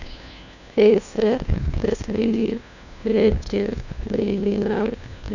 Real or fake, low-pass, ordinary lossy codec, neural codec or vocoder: fake; 7.2 kHz; none; codec, 16 kHz, 1 kbps, FunCodec, trained on LibriTTS, 50 frames a second